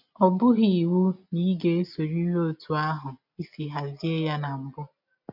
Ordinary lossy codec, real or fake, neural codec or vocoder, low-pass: none; real; none; 5.4 kHz